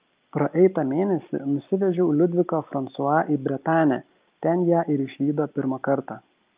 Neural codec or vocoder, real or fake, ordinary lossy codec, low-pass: none; real; Opus, 24 kbps; 3.6 kHz